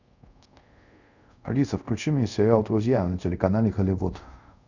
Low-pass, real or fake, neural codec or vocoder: 7.2 kHz; fake; codec, 24 kHz, 0.5 kbps, DualCodec